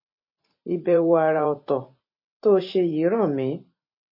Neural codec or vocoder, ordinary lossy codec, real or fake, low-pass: vocoder, 44.1 kHz, 128 mel bands every 512 samples, BigVGAN v2; MP3, 24 kbps; fake; 5.4 kHz